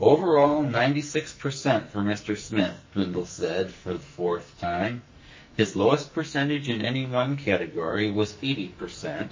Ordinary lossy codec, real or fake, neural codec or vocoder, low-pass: MP3, 32 kbps; fake; codec, 44.1 kHz, 2.6 kbps, SNAC; 7.2 kHz